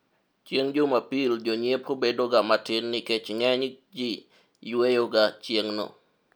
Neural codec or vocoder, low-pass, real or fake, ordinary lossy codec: none; none; real; none